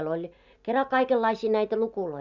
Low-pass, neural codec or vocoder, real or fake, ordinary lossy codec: 7.2 kHz; none; real; none